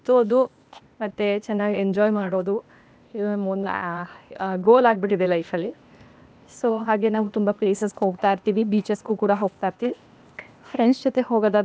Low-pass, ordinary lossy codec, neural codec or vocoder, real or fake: none; none; codec, 16 kHz, 0.8 kbps, ZipCodec; fake